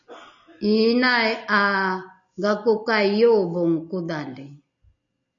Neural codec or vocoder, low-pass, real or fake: none; 7.2 kHz; real